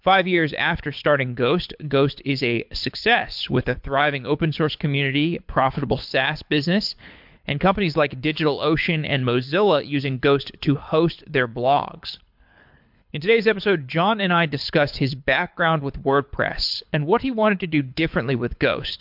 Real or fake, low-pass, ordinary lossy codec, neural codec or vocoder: fake; 5.4 kHz; MP3, 48 kbps; codec, 24 kHz, 6 kbps, HILCodec